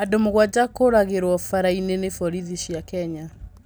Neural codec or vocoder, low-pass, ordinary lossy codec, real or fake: none; none; none; real